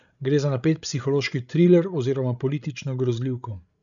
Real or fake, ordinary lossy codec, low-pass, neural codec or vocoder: fake; none; 7.2 kHz; codec, 16 kHz, 16 kbps, FreqCodec, larger model